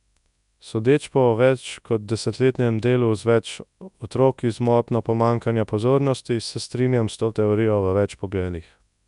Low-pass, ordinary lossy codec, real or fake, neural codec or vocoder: 10.8 kHz; none; fake; codec, 24 kHz, 0.9 kbps, WavTokenizer, large speech release